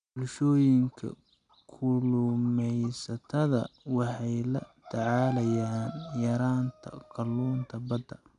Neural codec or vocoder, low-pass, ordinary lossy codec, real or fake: none; 9.9 kHz; none; real